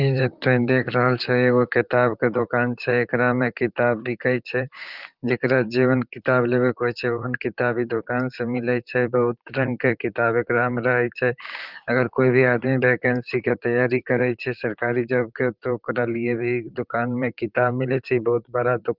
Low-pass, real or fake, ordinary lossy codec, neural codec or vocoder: 5.4 kHz; fake; Opus, 24 kbps; vocoder, 44.1 kHz, 128 mel bands, Pupu-Vocoder